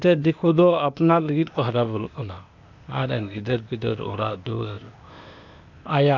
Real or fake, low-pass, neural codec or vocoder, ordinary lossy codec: fake; 7.2 kHz; codec, 16 kHz, 0.8 kbps, ZipCodec; none